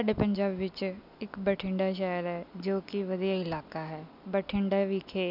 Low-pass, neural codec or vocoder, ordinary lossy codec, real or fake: 5.4 kHz; none; none; real